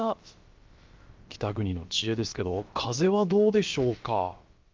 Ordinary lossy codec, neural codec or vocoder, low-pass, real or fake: Opus, 32 kbps; codec, 16 kHz, about 1 kbps, DyCAST, with the encoder's durations; 7.2 kHz; fake